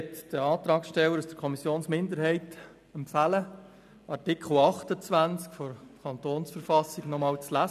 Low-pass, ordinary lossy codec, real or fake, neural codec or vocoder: 14.4 kHz; none; real; none